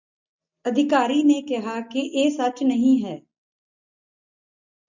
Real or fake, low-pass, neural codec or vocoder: real; 7.2 kHz; none